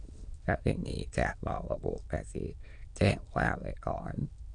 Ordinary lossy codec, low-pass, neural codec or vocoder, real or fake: none; 9.9 kHz; autoencoder, 22.05 kHz, a latent of 192 numbers a frame, VITS, trained on many speakers; fake